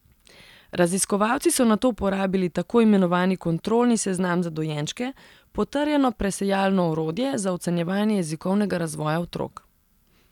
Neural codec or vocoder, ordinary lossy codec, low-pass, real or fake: none; none; 19.8 kHz; real